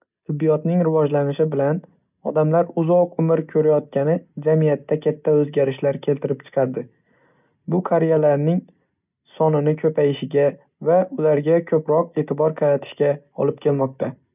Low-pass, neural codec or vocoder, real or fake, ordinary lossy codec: 3.6 kHz; none; real; none